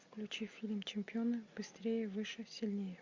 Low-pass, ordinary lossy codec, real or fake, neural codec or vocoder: 7.2 kHz; MP3, 64 kbps; real; none